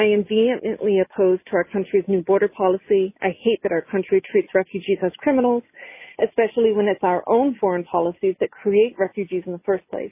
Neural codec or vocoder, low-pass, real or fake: none; 3.6 kHz; real